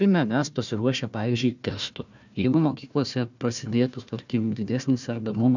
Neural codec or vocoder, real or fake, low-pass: codec, 16 kHz, 1 kbps, FunCodec, trained on Chinese and English, 50 frames a second; fake; 7.2 kHz